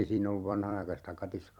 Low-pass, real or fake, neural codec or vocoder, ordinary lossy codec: 19.8 kHz; fake; vocoder, 44.1 kHz, 128 mel bands every 256 samples, BigVGAN v2; none